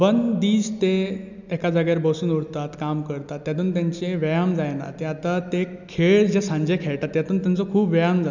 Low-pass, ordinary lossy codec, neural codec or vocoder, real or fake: 7.2 kHz; none; none; real